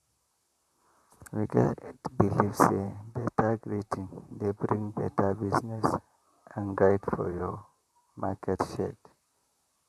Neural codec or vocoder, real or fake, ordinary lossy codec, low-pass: vocoder, 44.1 kHz, 128 mel bands, Pupu-Vocoder; fake; none; 14.4 kHz